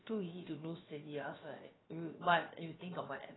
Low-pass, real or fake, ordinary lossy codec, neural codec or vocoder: 7.2 kHz; fake; AAC, 16 kbps; codec, 16 kHz, about 1 kbps, DyCAST, with the encoder's durations